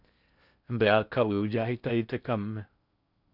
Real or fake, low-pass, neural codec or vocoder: fake; 5.4 kHz; codec, 16 kHz in and 24 kHz out, 0.6 kbps, FocalCodec, streaming, 4096 codes